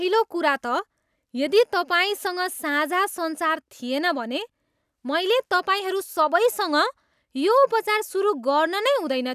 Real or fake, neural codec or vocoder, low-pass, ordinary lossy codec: real; none; 14.4 kHz; none